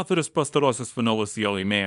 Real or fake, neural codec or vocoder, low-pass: fake; codec, 24 kHz, 0.9 kbps, WavTokenizer, small release; 10.8 kHz